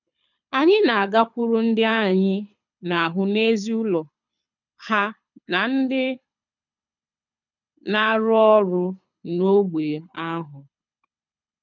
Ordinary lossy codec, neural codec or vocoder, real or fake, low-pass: none; codec, 24 kHz, 6 kbps, HILCodec; fake; 7.2 kHz